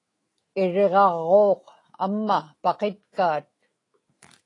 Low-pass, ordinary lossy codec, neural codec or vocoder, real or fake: 10.8 kHz; AAC, 32 kbps; autoencoder, 48 kHz, 128 numbers a frame, DAC-VAE, trained on Japanese speech; fake